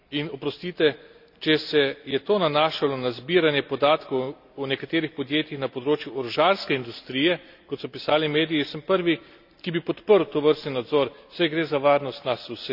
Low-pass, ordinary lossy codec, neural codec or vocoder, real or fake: 5.4 kHz; none; none; real